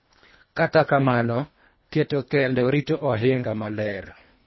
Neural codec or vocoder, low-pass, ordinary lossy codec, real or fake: codec, 24 kHz, 1.5 kbps, HILCodec; 7.2 kHz; MP3, 24 kbps; fake